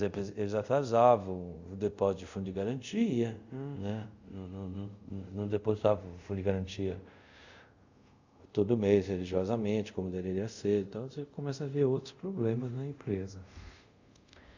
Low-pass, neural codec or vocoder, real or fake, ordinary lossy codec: 7.2 kHz; codec, 24 kHz, 0.5 kbps, DualCodec; fake; Opus, 64 kbps